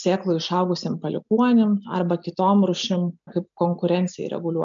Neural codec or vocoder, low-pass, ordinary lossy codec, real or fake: none; 7.2 kHz; MP3, 64 kbps; real